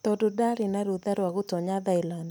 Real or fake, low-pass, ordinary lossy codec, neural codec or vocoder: real; none; none; none